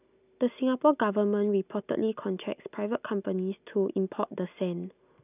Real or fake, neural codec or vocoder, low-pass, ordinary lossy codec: real; none; 3.6 kHz; none